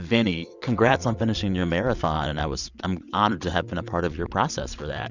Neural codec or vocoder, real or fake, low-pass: vocoder, 22.05 kHz, 80 mel bands, WaveNeXt; fake; 7.2 kHz